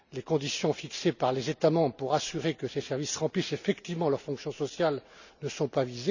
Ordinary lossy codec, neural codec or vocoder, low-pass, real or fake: none; none; 7.2 kHz; real